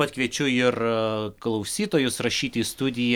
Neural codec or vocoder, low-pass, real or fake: none; 19.8 kHz; real